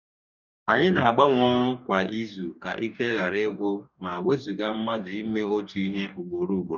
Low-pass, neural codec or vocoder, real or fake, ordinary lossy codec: 7.2 kHz; codec, 44.1 kHz, 2.6 kbps, DAC; fake; none